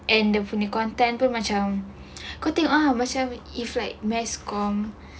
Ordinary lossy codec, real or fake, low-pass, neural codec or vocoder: none; real; none; none